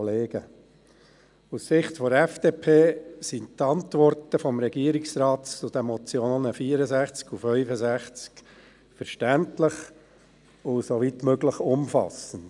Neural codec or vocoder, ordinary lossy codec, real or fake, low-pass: none; none; real; 10.8 kHz